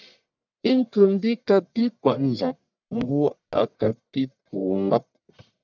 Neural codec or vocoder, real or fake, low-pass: codec, 44.1 kHz, 1.7 kbps, Pupu-Codec; fake; 7.2 kHz